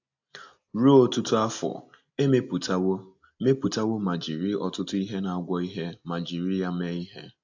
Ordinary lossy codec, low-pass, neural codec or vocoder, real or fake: AAC, 48 kbps; 7.2 kHz; none; real